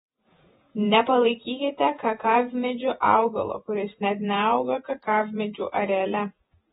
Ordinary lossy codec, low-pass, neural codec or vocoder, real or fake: AAC, 16 kbps; 19.8 kHz; vocoder, 48 kHz, 128 mel bands, Vocos; fake